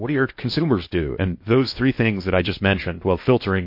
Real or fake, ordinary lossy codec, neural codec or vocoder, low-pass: fake; MP3, 32 kbps; codec, 16 kHz in and 24 kHz out, 0.8 kbps, FocalCodec, streaming, 65536 codes; 5.4 kHz